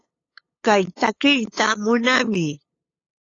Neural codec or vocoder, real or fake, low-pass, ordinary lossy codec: codec, 16 kHz, 8 kbps, FunCodec, trained on LibriTTS, 25 frames a second; fake; 7.2 kHz; AAC, 32 kbps